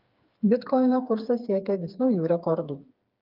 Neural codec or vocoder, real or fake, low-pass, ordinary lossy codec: codec, 16 kHz, 4 kbps, FreqCodec, smaller model; fake; 5.4 kHz; Opus, 32 kbps